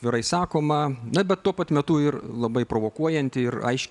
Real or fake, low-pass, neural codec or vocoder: real; 10.8 kHz; none